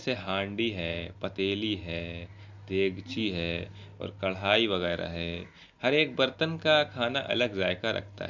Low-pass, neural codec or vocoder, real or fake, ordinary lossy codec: 7.2 kHz; none; real; Opus, 64 kbps